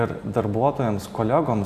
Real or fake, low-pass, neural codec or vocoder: fake; 14.4 kHz; vocoder, 44.1 kHz, 128 mel bands every 256 samples, BigVGAN v2